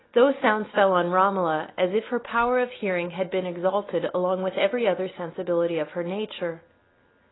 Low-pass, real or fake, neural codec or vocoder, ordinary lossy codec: 7.2 kHz; real; none; AAC, 16 kbps